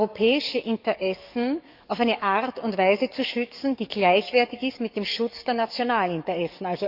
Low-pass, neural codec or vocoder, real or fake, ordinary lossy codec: 5.4 kHz; codec, 44.1 kHz, 7.8 kbps, DAC; fake; none